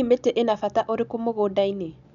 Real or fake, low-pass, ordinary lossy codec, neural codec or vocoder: real; 7.2 kHz; MP3, 96 kbps; none